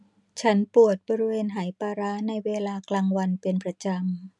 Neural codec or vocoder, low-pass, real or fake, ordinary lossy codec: none; 10.8 kHz; real; none